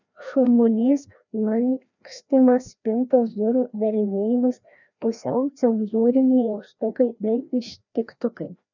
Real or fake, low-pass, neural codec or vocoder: fake; 7.2 kHz; codec, 16 kHz, 1 kbps, FreqCodec, larger model